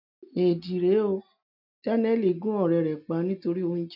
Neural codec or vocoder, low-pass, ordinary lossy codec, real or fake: none; 5.4 kHz; none; real